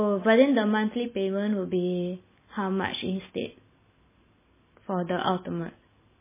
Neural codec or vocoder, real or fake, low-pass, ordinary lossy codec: none; real; 3.6 kHz; MP3, 16 kbps